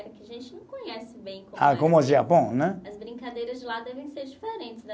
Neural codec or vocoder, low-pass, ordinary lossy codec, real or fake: none; none; none; real